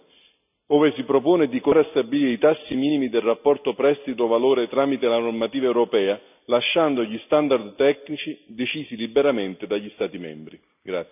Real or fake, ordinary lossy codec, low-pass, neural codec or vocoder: real; none; 3.6 kHz; none